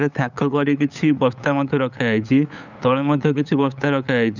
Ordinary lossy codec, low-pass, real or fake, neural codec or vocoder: none; 7.2 kHz; fake; codec, 16 kHz, 8 kbps, FunCodec, trained on LibriTTS, 25 frames a second